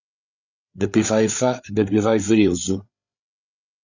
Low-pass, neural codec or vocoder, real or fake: 7.2 kHz; codec, 16 kHz, 8 kbps, FreqCodec, larger model; fake